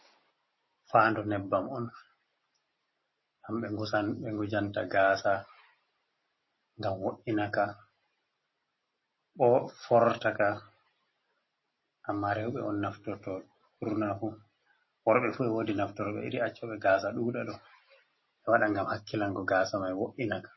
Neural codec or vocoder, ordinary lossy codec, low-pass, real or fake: none; MP3, 24 kbps; 7.2 kHz; real